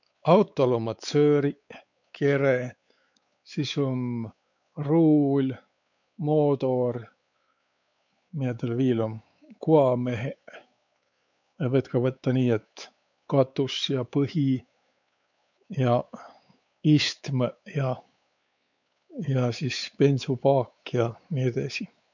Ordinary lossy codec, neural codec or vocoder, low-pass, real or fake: none; codec, 16 kHz, 4 kbps, X-Codec, WavLM features, trained on Multilingual LibriSpeech; 7.2 kHz; fake